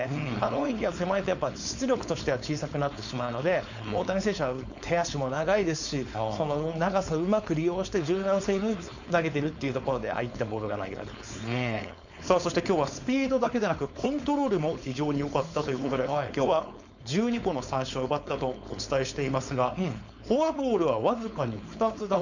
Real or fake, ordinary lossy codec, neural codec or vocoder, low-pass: fake; none; codec, 16 kHz, 4.8 kbps, FACodec; 7.2 kHz